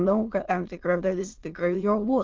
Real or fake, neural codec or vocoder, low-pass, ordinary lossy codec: fake; autoencoder, 22.05 kHz, a latent of 192 numbers a frame, VITS, trained on many speakers; 7.2 kHz; Opus, 16 kbps